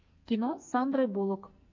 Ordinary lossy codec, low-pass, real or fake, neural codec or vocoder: MP3, 48 kbps; 7.2 kHz; fake; codec, 44.1 kHz, 2.6 kbps, SNAC